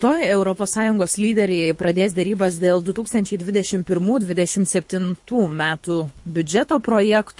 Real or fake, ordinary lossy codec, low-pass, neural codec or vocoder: fake; MP3, 48 kbps; 10.8 kHz; codec, 24 kHz, 3 kbps, HILCodec